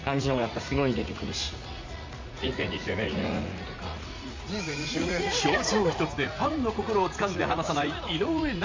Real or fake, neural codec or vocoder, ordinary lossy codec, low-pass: fake; vocoder, 44.1 kHz, 80 mel bands, Vocos; MP3, 64 kbps; 7.2 kHz